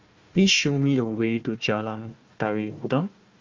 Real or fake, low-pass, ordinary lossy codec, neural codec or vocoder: fake; 7.2 kHz; Opus, 32 kbps; codec, 16 kHz, 1 kbps, FunCodec, trained on Chinese and English, 50 frames a second